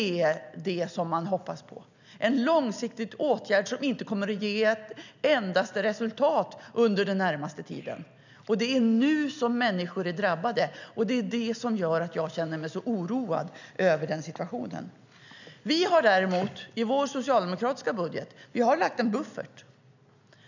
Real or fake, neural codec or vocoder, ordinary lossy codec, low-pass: real; none; none; 7.2 kHz